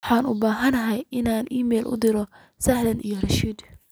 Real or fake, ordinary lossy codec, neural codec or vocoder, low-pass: real; none; none; none